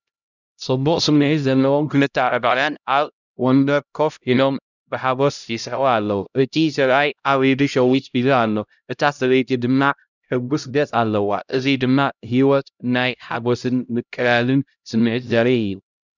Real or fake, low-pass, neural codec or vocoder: fake; 7.2 kHz; codec, 16 kHz, 0.5 kbps, X-Codec, HuBERT features, trained on LibriSpeech